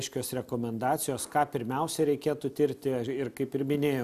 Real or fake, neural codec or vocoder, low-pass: real; none; 14.4 kHz